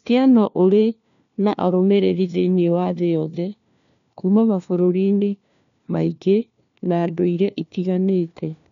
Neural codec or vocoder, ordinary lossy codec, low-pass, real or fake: codec, 16 kHz, 1 kbps, FunCodec, trained on LibriTTS, 50 frames a second; none; 7.2 kHz; fake